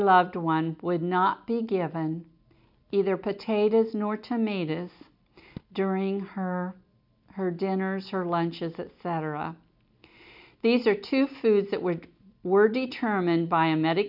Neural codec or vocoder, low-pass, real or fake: none; 5.4 kHz; real